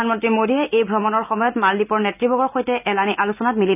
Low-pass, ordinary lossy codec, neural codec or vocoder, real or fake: 3.6 kHz; none; none; real